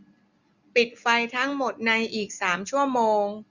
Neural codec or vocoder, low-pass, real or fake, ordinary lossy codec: none; 7.2 kHz; real; Opus, 64 kbps